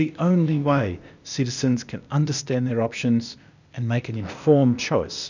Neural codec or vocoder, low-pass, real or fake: codec, 16 kHz, 0.8 kbps, ZipCodec; 7.2 kHz; fake